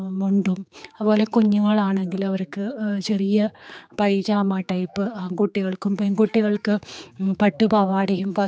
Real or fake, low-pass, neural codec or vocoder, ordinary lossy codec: fake; none; codec, 16 kHz, 4 kbps, X-Codec, HuBERT features, trained on general audio; none